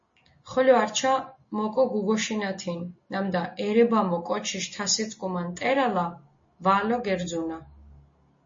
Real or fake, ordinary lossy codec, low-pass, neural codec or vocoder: real; MP3, 32 kbps; 7.2 kHz; none